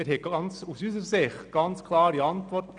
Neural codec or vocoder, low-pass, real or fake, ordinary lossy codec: none; 9.9 kHz; real; none